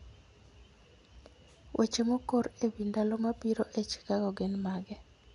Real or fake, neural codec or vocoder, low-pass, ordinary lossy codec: real; none; 10.8 kHz; none